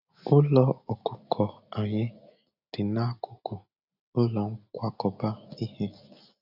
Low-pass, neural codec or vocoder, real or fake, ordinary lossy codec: 5.4 kHz; none; real; none